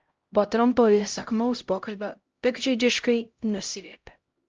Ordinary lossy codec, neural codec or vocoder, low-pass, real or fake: Opus, 24 kbps; codec, 16 kHz, 0.5 kbps, X-Codec, HuBERT features, trained on LibriSpeech; 7.2 kHz; fake